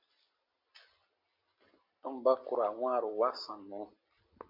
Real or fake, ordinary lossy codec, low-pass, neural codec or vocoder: real; MP3, 48 kbps; 5.4 kHz; none